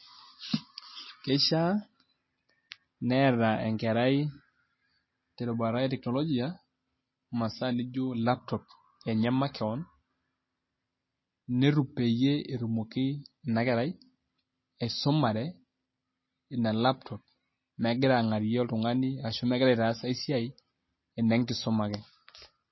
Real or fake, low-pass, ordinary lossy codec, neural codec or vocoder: real; 7.2 kHz; MP3, 24 kbps; none